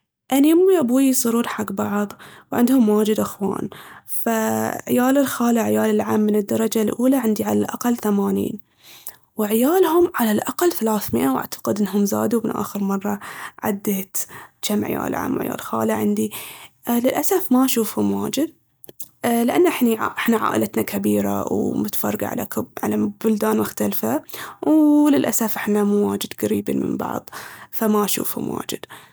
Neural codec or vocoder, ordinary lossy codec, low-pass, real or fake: none; none; none; real